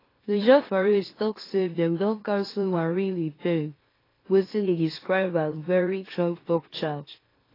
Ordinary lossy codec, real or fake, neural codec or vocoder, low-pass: AAC, 24 kbps; fake; autoencoder, 44.1 kHz, a latent of 192 numbers a frame, MeloTTS; 5.4 kHz